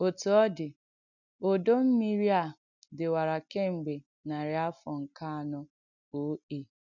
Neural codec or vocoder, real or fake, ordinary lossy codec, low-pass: none; real; none; 7.2 kHz